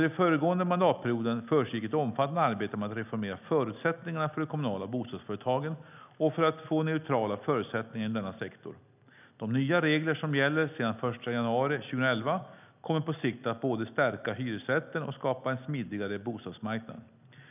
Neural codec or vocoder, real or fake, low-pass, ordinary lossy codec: none; real; 3.6 kHz; none